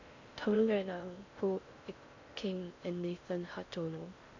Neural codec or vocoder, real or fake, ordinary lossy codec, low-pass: codec, 16 kHz in and 24 kHz out, 0.6 kbps, FocalCodec, streaming, 4096 codes; fake; MP3, 64 kbps; 7.2 kHz